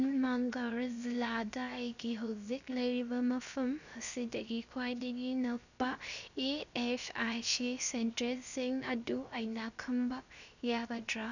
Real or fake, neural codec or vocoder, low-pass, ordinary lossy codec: fake; codec, 16 kHz, 0.7 kbps, FocalCodec; 7.2 kHz; none